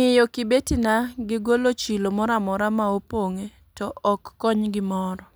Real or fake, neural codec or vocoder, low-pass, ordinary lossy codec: real; none; none; none